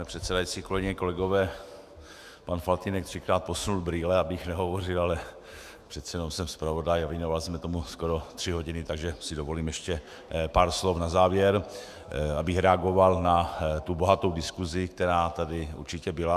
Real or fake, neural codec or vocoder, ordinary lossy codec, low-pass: fake; autoencoder, 48 kHz, 128 numbers a frame, DAC-VAE, trained on Japanese speech; Opus, 64 kbps; 14.4 kHz